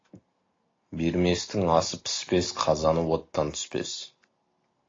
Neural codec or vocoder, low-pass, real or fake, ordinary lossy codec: none; 7.2 kHz; real; AAC, 32 kbps